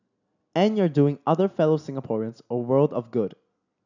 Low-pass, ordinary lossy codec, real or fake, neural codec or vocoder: 7.2 kHz; none; real; none